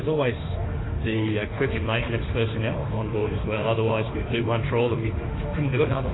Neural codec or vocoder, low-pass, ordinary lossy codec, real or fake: autoencoder, 48 kHz, 32 numbers a frame, DAC-VAE, trained on Japanese speech; 7.2 kHz; AAC, 16 kbps; fake